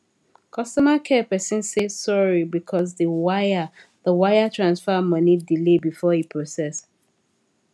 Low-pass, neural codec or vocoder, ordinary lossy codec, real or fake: none; none; none; real